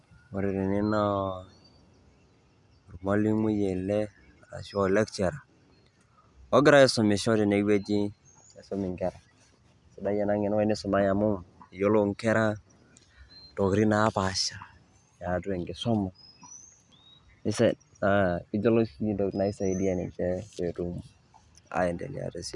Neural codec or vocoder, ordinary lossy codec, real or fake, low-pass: none; none; real; 10.8 kHz